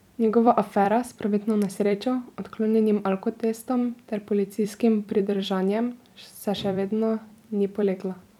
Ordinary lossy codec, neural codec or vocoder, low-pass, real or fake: none; vocoder, 44.1 kHz, 128 mel bands every 256 samples, BigVGAN v2; 19.8 kHz; fake